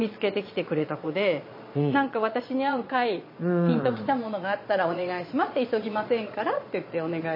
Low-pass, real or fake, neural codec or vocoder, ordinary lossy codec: 5.4 kHz; fake; vocoder, 22.05 kHz, 80 mel bands, Vocos; MP3, 24 kbps